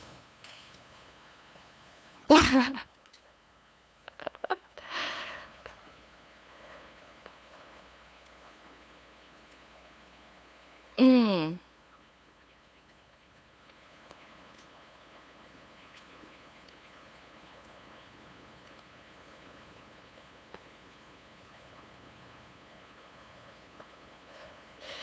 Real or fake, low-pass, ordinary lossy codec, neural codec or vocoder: fake; none; none; codec, 16 kHz, 2 kbps, FunCodec, trained on LibriTTS, 25 frames a second